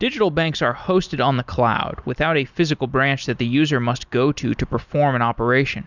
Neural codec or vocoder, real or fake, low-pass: none; real; 7.2 kHz